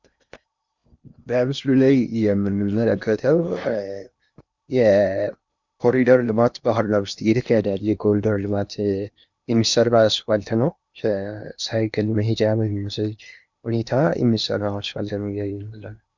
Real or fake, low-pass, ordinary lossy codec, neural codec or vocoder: fake; 7.2 kHz; Opus, 64 kbps; codec, 16 kHz in and 24 kHz out, 0.8 kbps, FocalCodec, streaming, 65536 codes